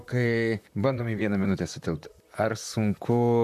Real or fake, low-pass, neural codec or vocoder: fake; 14.4 kHz; vocoder, 44.1 kHz, 128 mel bands, Pupu-Vocoder